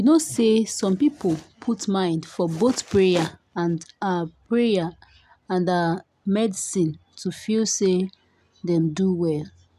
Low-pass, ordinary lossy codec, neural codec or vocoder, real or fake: 14.4 kHz; none; none; real